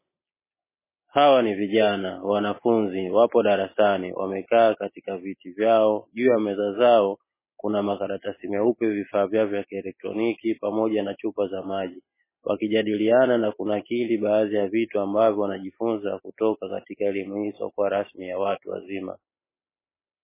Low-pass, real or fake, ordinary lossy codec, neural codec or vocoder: 3.6 kHz; real; MP3, 16 kbps; none